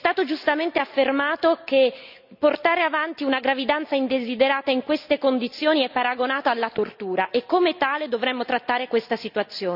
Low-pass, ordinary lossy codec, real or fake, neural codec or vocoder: 5.4 kHz; none; real; none